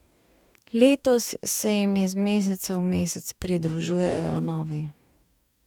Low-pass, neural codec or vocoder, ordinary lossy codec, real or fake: 19.8 kHz; codec, 44.1 kHz, 2.6 kbps, DAC; none; fake